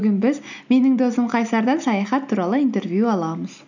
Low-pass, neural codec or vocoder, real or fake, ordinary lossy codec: 7.2 kHz; none; real; none